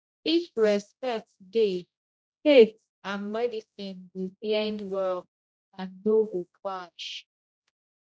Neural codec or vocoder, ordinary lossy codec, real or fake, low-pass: codec, 16 kHz, 0.5 kbps, X-Codec, HuBERT features, trained on general audio; none; fake; none